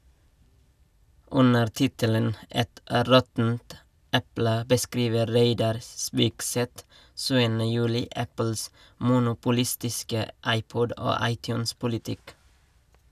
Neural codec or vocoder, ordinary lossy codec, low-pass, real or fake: none; none; 14.4 kHz; real